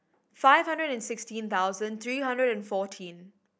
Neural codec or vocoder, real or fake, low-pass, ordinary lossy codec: none; real; none; none